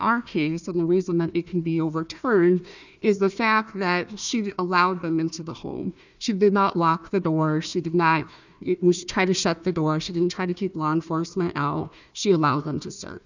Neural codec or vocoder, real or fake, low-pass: codec, 16 kHz, 1 kbps, FunCodec, trained on Chinese and English, 50 frames a second; fake; 7.2 kHz